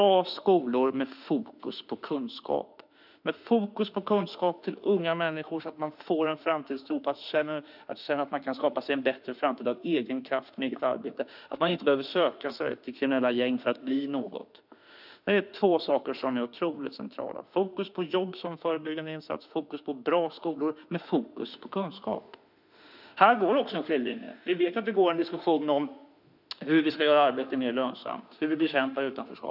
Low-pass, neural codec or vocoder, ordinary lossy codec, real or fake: 5.4 kHz; autoencoder, 48 kHz, 32 numbers a frame, DAC-VAE, trained on Japanese speech; none; fake